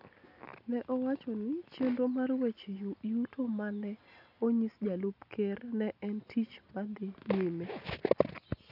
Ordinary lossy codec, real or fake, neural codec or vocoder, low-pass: none; real; none; 5.4 kHz